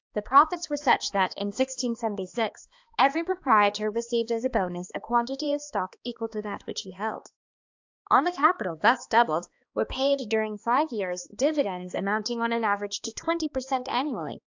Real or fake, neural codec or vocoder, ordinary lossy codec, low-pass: fake; codec, 16 kHz, 2 kbps, X-Codec, HuBERT features, trained on balanced general audio; AAC, 48 kbps; 7.2 kHz